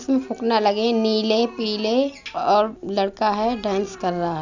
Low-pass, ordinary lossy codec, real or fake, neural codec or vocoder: 7.2 kHz; none; real; none